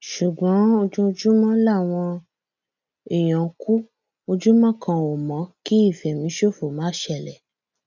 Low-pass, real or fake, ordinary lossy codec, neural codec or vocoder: 7.2 kHz; real; none; none